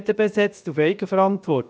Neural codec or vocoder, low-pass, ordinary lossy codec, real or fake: codec, 16 kHz, 0.7 kbps, FocalCodec; none; none; fake